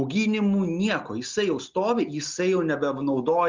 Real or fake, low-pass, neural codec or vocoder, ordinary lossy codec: real; 7.2 kHz; none; Opus, 32 kbps